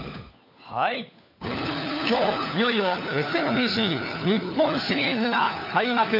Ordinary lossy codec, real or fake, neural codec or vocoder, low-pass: none; fake; codec, 16 kHz, 4 kbps, FunCodec, trained on LibriTTS, 50 frames a second; 5.4 kHz